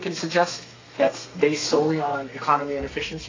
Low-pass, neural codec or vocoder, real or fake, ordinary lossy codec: 7.2 kHz; codec, 32 kHz, 1.9 kbps, SNAC; fake; AAC, 32 kbps